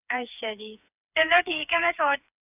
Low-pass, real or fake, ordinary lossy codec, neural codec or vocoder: 3.6 kHz; fake; none; codec, 16 kHz, 4 kbps, FreqCodec, smaller model